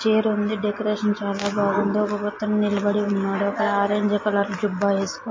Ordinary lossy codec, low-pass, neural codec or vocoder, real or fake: MP3, 32 kbps; 7.2 kHz; none; real